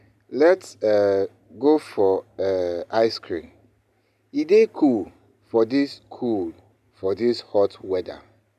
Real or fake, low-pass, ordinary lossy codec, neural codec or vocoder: real; 14.4 kHz; none; none